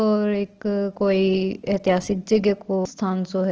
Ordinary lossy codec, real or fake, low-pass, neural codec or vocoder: Opus, 16 kbps; real; 7.2 kHz; none